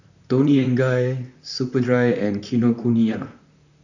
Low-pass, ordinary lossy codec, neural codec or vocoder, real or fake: 7.2 kHz; none; vocoder, 44.1 kHz, 128 mel bands, Pupu-Vocoder; fake